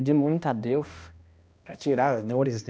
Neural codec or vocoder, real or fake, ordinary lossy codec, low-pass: codec, 16 kHz, 1 kbps, X-Codec, HuBERT features, trained on balanced general audio; fake; none; none